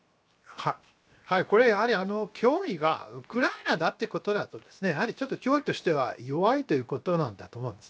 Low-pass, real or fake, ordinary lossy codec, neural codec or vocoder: none; fake; none; codec, 16 kHz, 0.7 kbps, FocalCodec